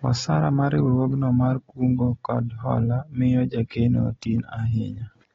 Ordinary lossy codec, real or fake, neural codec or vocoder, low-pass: AAC, 24 kbps; real; none; 7.2 kHz